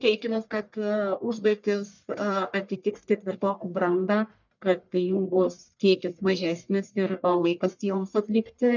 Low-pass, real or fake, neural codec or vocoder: 7.2 kHz; fake; codec, 44.1 kHz, 1.7 kbps, Pupu-Codec